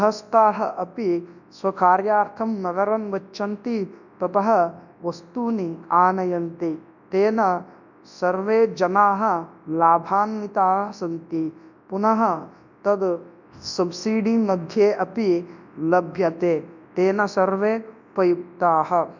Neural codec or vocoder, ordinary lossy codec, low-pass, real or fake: codec, 24 kHz, 0.9 kbps, WavTokenizer, large speech release; none; 7.2 kHz; fake